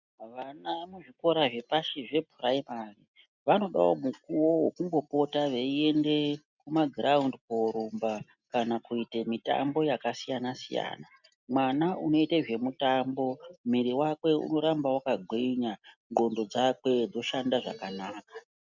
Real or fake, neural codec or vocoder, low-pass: real; none; 7.2 kHz